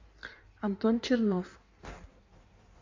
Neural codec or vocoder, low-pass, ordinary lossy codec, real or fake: codec, 16 kHz in and 24 kHz out, 1.1 kbps, FireRedTTS-2 codec; 7.2 kHz; MP3, 64 kbps; fake